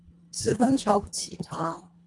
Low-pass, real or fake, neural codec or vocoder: 10.8 kHz; fake; codec, 24 kHz, 1.5 kbps, HILCodec